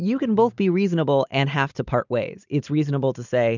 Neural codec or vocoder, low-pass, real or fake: none; 7.2 kHz; real